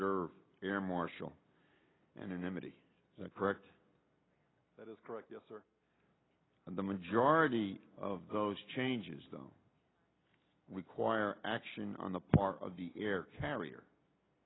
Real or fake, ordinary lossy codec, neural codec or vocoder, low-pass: real; AAC, 16 kbps; none; 7.2 kHz